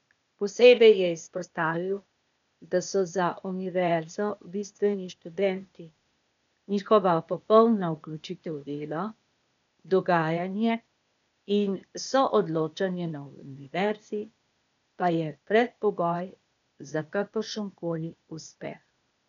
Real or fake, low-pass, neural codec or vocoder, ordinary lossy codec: fake; 7.2 kHz; codec, 16 kHz, 0.8 kbps, ZipCodec; none